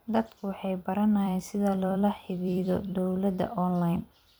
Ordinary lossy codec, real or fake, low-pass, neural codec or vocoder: none; fake; none; vocoder, 44.1 kHz, 128 mel bands every 256 samples, BigVGAN v2